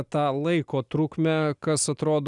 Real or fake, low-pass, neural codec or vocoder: real; 10.8 kHz; none